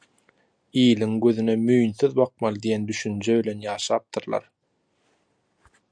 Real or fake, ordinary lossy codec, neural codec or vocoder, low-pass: real; MP3, 96 kbps; none; 9.9 kHz